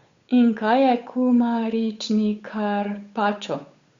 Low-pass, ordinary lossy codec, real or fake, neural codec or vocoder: 7.2 kHz; Opus, 64 kbps; fake; codec, 16 kHz, 8 kbps, FunCodec, trained on Chinese and English, 25 frames a second